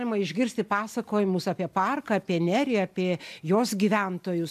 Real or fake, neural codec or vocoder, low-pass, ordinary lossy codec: real; none; 14.4 kHz; AAC, 96 kbps